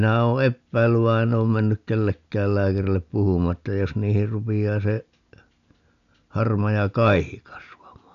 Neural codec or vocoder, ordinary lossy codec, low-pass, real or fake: none; none; 7.2 kHz; real